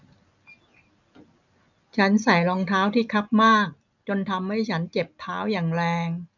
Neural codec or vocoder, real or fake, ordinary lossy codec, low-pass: none; real; none; 7.2 kHz